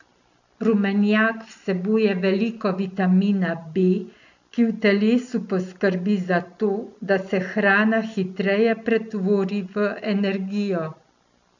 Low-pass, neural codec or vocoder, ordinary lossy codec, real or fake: 7.2 kHz; none; none; real